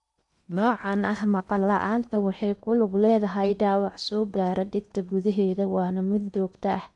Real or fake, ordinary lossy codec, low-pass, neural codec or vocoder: fake; none; 10.8 kHz; codec, 16 kHz in and 24 kHz out, 0.8 kbps, FocalCodec, streaming, 65536 codes